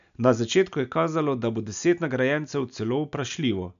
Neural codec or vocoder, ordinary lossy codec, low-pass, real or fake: none; none; 7.2 kHz; real